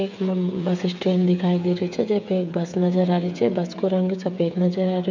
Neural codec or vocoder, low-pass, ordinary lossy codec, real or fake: codec, 16 kHz, 8 kbps, FreqCodec, smaller model; 7.2 kHz; MP3, 64 kbps; fake